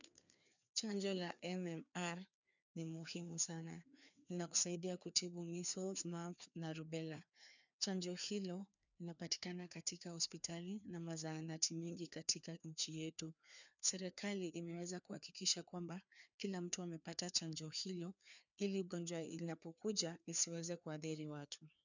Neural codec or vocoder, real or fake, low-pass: codec, 16 kHz, 2 kbps, FreqCodec, larger model; fake; 7.2 kHz